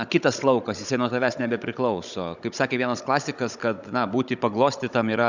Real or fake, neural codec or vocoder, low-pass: fake; codec, 16 kHz, 16 kbps, FunCodec, trained on Chinese and English, 50 frames a second; 7.2 kHz